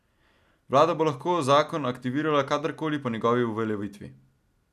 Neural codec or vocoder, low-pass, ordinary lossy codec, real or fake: none; 14.4 kHz; none; real